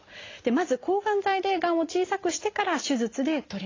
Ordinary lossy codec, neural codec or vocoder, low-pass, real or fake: AAC, 32 kbps; none; 7.2 kHz; real